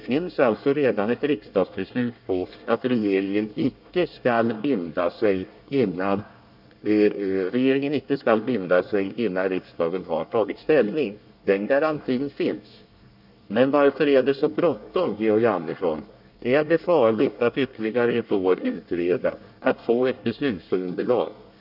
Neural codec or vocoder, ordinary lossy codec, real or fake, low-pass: codec, 24 kHz, 1 kbps, SNAC; none; fake; 5.4 kHz